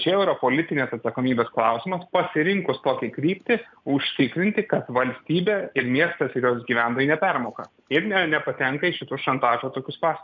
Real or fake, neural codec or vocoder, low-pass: real; none; 7.2 kHz